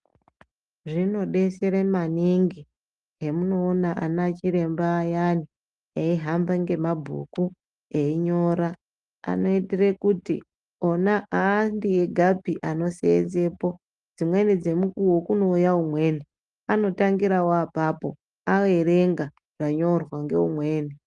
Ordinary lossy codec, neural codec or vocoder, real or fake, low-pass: Opus, 32 kbps; none; real; 10.8 kHz